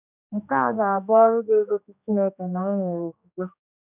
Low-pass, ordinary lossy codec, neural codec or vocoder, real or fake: 3.6 kHz; none; codec, 16 kHz, 1 kbps, X-Codec, HuBERT features, trained on general audio; fake